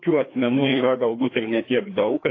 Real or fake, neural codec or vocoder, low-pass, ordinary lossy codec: fake; codec, 16 kHz, 2 kbps, FreqCodec, larger model; 7.2 kHz; AAC, 32 kbps